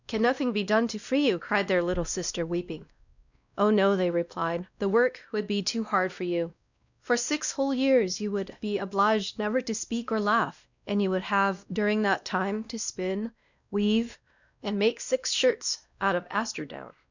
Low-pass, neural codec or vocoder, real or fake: 7.2 kHz; codec, 16 kHz, 1 kbps, X-Codec, WavLM features, trained on Multilingual LibriSpeech; fake